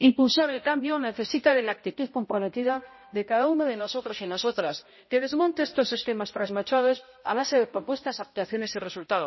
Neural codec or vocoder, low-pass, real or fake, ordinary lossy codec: codec, 16 kHz, 0.5 kbps, X-Codec, HuBERT features, trained on balanced general audio; 7.2 kHz; fake; MP3, 24 kbps